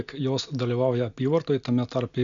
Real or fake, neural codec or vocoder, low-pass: real; none; 7.2 kHz